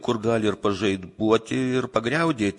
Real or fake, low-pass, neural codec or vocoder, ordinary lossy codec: real; 10.8 kHz; none; MP3, 48 kbps